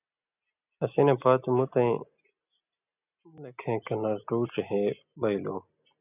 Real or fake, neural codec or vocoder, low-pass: real; none; 3.6 kHz